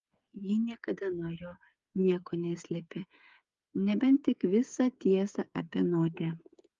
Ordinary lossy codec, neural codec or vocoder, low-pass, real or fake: Opus, 32 kbps; codec, 16 kHz, 8 kbps, FreqCodec, smaller model; 7.2 kHz; fake